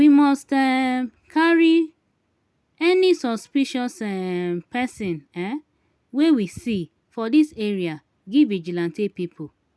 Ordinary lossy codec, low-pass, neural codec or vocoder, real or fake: none; none; none; real